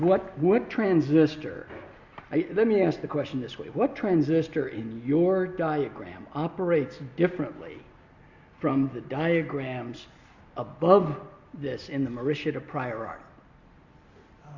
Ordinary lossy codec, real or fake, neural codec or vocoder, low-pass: Opus, 64 kbps; real; none; 7.2 kHz